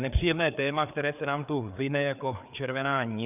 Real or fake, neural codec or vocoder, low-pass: fake; codec, 16 kHz, 4 kbps, FreqCodec, larger model; 3.6 kHz